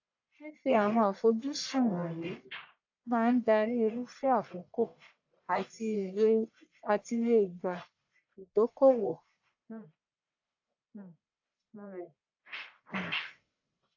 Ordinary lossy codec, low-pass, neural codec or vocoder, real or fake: AAC, 48 kbps; 7.2 kHz; codec, 44.1 kHz, 1.7 kbps, Pupu-Codec; fake